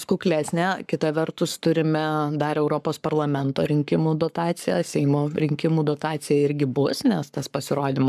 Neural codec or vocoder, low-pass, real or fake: codec, 44.1 kHz, 7.8 kbps, Pupu-Codec; 14.4 kHz; fake